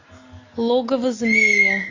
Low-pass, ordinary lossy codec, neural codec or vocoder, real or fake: 7.2 kHz; AAC, 32 kbps; none; real